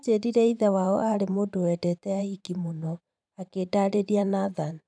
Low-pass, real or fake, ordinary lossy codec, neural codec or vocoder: 9.9 kHz; fake; none; vocoder, 44.1 kHz, 128 mel bands every 512 samples, BigVGAN v2